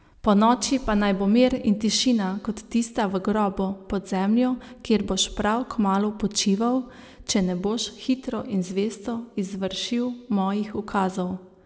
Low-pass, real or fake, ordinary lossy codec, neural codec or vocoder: none; real; none; none